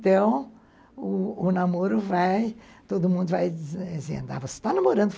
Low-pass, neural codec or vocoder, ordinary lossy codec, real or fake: none; none; none; real